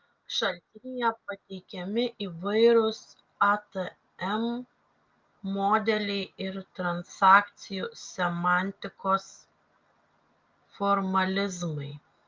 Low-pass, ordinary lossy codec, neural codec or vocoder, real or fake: 7.2 kHz; Opus, 24 kbps; none; real